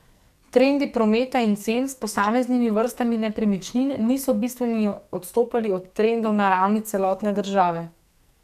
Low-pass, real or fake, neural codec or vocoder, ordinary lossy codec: 14.4 kHz; fake; codec, 44.1 kHz, 2.6 kbps, SNAC; none